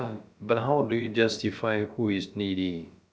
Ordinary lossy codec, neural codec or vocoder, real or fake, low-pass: none; codec, 16 kHz, about 1 kbps, DyCAST, with the encoder's durations; fake; none